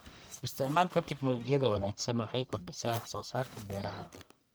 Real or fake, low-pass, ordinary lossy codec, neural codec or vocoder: fake; none; none; codec, 44.1 kHz, 1.7 kbps, Pupu-Codec